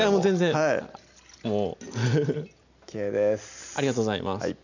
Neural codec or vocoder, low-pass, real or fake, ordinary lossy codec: none; 7.2 kHz; real; none